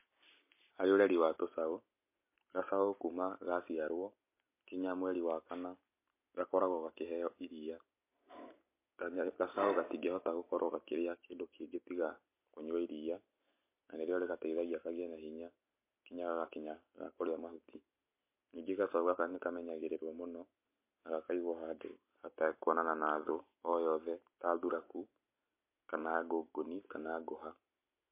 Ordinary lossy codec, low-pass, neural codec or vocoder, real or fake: MP3, 24 kbps; 3.6 kHz; none; real